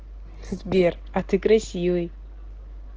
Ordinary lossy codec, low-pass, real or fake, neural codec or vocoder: Opus, 16 kbps; 7.2 kHz; real; none